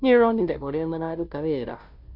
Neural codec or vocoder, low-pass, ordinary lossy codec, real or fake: codec, 16 kHz, 0.5 kbps, FunCodec, trained on Chinese and English, 25 frames a second; 5.4 kHz; none; fake